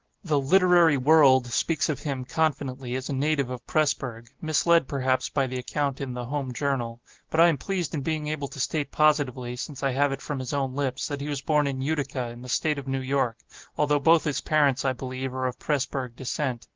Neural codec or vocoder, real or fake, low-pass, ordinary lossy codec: none; real; 7.2 kHz; Opus, 16 kbps